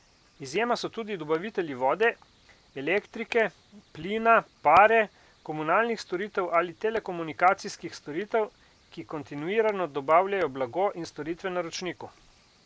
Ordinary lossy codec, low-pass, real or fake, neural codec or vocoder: none; none; real; none